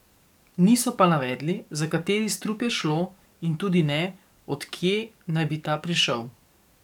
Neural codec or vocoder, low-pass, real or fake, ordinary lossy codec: codec, 44.1 kHz, 7.8 kbps, DAC; 19.8 kHz; fake; none